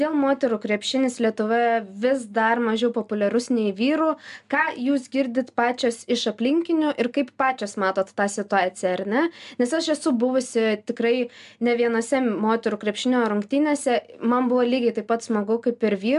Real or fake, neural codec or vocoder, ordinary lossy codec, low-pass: real; none; AAC, 96 kbps; 10.8 kHz